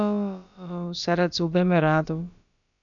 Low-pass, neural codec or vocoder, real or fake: 7.2 kHz; codec, 16 kHz, about 1 kbps, DyCAST, with the encoder's durations; fake